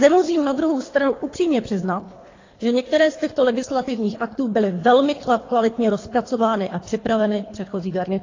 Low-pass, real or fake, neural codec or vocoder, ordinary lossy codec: 7.2 kHz; fake; codec, 24 kHz, 3 kbps, HILCodec; AAC, 32 kbps